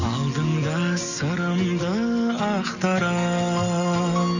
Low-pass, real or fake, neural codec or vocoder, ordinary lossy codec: 7.2 kHz; real; none; none